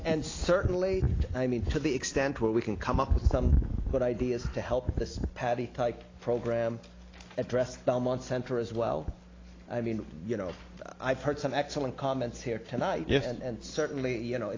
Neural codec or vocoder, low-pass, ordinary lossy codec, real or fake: none; 7.2 kHz; AAC, 32 kbps; real